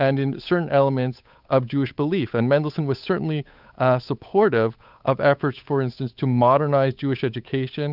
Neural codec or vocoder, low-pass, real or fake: codec, 16 kHz, 8 kbps, FunCodec, trained on Chinese and English, 25 frames a second; 5.4 kHz; fake